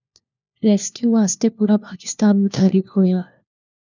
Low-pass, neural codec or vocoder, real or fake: 7.2 kHz; codec, 16 kHz, 1 kbps, FunCodec, trained on LibriTTS, 50 frames a second; fake